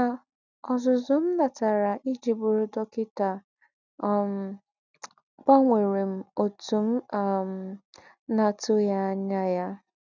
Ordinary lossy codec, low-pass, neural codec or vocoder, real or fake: none; 7.2 kHz; none; real